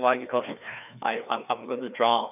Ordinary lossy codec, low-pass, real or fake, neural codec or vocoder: none; 3.6 kHz; fake; codec, 16 kHz, 2 kbps, FreqCodec, larger model